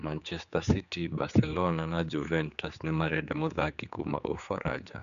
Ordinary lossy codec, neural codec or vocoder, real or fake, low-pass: none; codec, 16 kHz, 4 kbps, X-Codec, HuBERT features, trained on general audio; fake; 7.2 kHz